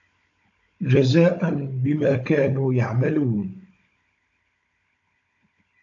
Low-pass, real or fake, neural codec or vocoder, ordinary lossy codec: 7.2 kHz; fake; codec, 16 kHz, 16 kbps, FunCodec, trained on Chinese and English, 50 frames a second; AAC, 48 kbps